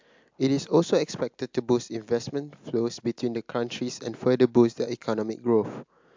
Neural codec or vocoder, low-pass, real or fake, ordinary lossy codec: none; 7.2 kHz; real; MP3, 64 kbps